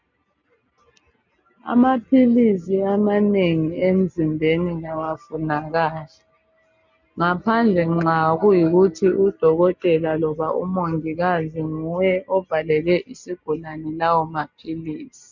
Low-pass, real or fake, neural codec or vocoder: 7.2 kHz; real; none